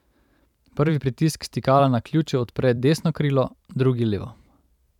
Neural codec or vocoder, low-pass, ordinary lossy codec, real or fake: vocoder, 44.1 kHz, 128 mel bands every 512 samples, BigVGAN v2; 19.8 kHz; none; fake